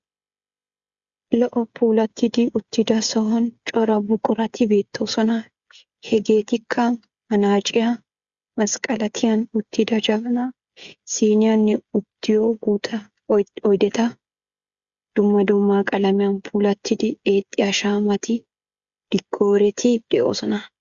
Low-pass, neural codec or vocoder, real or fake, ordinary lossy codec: 7.2 kHz; codec, 16 kHz, 16 kbps, FreqCodec, smaller model; fake; Opus, 64 kbps